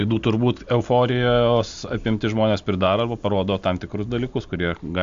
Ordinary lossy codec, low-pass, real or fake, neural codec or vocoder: AAC, 64 kbps; 7.2 kHz; real; none